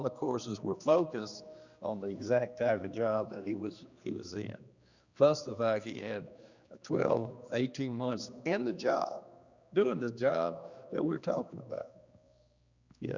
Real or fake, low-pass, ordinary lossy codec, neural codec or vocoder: fake; 7.2 kHz; Opus, 64 kbps; codec, 16 kHz, 2 kbps, X-Codec, HuBERT features, trained on general audio